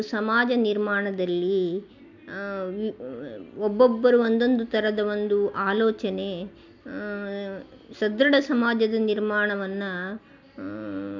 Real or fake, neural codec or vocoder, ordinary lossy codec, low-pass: real; none; MP3, 64 kbps; 7.2 kHz